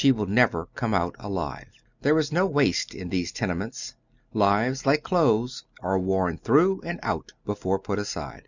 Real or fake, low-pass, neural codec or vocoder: real; 7.2 kHz; none